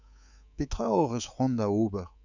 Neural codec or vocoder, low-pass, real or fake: codec, 24 kHz, 3.1 kbps, DualCodec; 7.2 kHz; fake